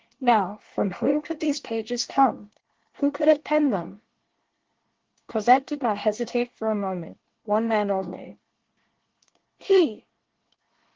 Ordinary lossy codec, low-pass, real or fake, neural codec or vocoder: Opus, 16 kbps; 7.2 kHz; fake; codec, 24 kHz, 1 kbps, SNAC